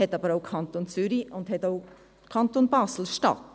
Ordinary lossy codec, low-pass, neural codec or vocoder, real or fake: none; none; none; real